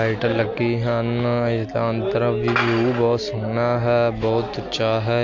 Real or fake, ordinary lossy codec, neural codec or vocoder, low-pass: real; MP3, 48 kbps; none; 7.2 kHz